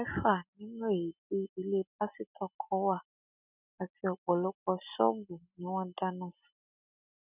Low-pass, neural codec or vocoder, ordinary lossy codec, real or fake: 3.6 kHz; none; none; real